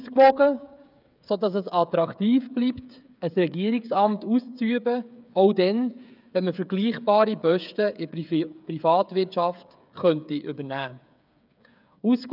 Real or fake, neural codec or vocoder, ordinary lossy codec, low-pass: fake; codec, 16 kHz, 8 kbps, FreqCodec, smaller model; none; 5.4 kHz